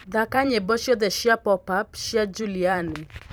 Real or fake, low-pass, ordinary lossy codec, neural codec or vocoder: fake; none; none; vocoder, 44.1 kHz, 128 mel bands every 512 samples, BigVGAN v2